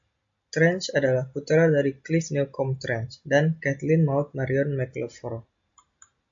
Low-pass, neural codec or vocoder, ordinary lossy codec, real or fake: 7.2 kHz; none; MP3, 48 kbps; real